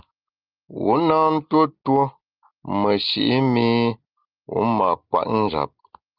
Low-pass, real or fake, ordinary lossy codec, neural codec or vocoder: 5.4 kHz; real; Opus, 32 kbps; none